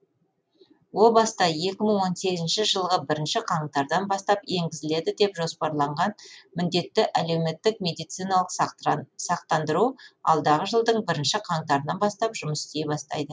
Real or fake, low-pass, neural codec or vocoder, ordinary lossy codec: real; none; none; none